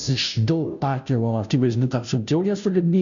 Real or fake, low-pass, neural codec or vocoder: fake; 7.2 kHz; codec, 16 kHz, 0.5 kbps, FunCodec, trained on Chinese and English, 25 frames a second